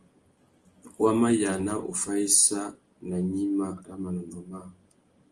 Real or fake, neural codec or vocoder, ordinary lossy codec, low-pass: real; none; Opus, 24 kbps; 10.8 kHz